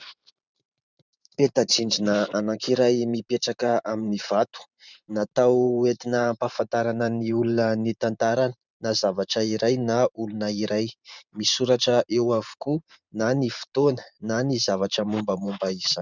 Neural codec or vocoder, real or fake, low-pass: vocoder, 44.1 kHz, 128 mel bands every 512 samples, BigVGAN v2; fake; 7.2 kHz